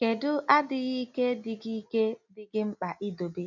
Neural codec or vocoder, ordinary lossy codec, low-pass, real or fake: none; none; 7.2 kHz; real